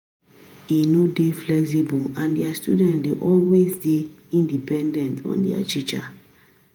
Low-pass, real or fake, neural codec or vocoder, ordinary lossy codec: none; fake; vocoder, 48 kHz, 128 mel bands, Vocos; none